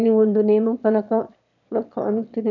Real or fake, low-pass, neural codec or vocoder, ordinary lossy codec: fake; 7.2 kHz; autoencoder, 22.05 kHz, a latent of 192 numbers a frame, VITS, trained on one speaker; none